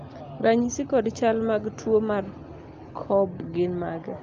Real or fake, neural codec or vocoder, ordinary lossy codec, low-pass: real; none; Opus, 32 kbps; 7.2 kHz